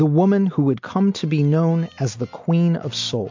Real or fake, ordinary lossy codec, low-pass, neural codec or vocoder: real; MP3, 48 kbps; 7.2 kHz; none